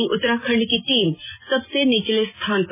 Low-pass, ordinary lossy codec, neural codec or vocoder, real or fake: 3.6 kHz; MP3, 16 kbps; none; real